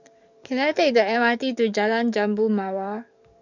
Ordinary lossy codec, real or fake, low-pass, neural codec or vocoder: none; fake; 7.2 kHz; codec, 44.1 kHz, 7.8 kbps, DAC